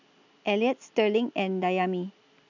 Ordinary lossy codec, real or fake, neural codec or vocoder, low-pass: none; real; none; 7.2 kHz